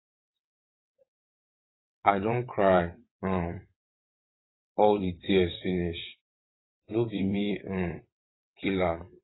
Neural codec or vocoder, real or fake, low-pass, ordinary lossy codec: vocoder, 22.05 kHz, 80 mel bands, WaveNeXt; fake; 7.2 kHz; AAC, 16 kbps